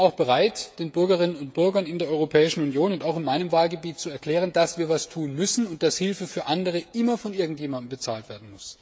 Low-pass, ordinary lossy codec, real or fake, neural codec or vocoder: none; none; fake; codec, 16 kHz, 16 kbps, FreqCodec, smaller model